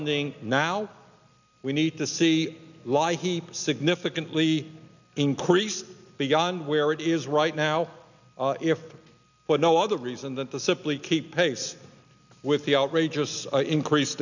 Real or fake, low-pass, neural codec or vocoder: real; 7.2 kHz; none